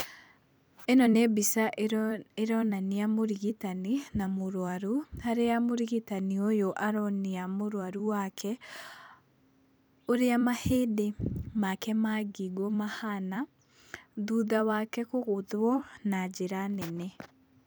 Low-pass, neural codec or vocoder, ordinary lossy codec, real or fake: none; vocoder, 44.1 kHz, 128 mel bands every 256 samples, BigVGAN v2; none; fake